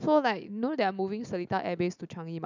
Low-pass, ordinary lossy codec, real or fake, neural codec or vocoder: 7.2 kHz; none; real; none